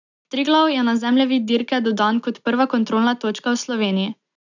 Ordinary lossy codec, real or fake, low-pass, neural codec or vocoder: none; real; 7.2 kHz; none